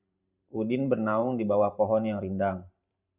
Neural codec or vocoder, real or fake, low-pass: vocoder, 44.1 kHz, 128 mel bands every 512 samples, BigVGAN v2; fake; 3.6 kHz